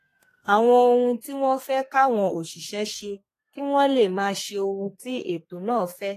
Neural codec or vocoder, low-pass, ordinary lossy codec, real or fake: codec, 32 kHz, 1.9 kbps, SNAC; 14.4 kHz; AAC, 48 kbps; fake